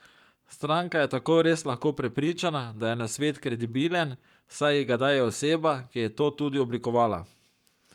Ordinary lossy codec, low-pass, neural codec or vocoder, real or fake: none; 19.8 kHz; codec, 44.1 kHz, 7.8 kbps, Pupu-Codec; fake